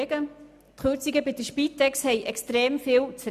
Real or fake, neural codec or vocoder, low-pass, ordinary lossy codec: real; none; 14.4 kHz; none